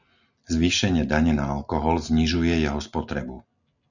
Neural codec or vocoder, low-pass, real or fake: none; 7.2 kHz; real